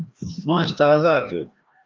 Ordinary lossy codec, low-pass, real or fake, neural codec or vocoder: Opus, 24 kbps; 7.2 kHz; fake; codec, 16 kHz, 1 kbps, FreqCodec, larger model